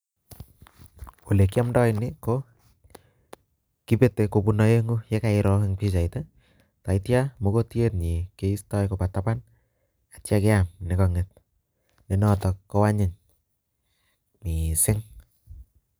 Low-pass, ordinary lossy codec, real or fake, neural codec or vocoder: none; none; real; none